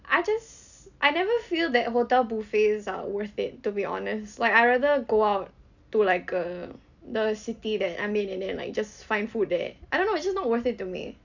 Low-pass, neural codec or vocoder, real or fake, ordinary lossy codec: 7.2 kHz; none; real; none